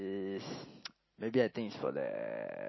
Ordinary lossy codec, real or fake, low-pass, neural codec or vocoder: MP3, 24 kbps; real; 7.2 kHz; none